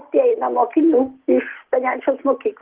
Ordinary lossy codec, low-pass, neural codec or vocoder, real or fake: Opus, 16 kbps; 3.6 kHz; none; real